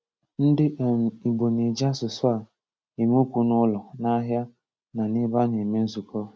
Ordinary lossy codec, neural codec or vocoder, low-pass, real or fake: none; none; none; real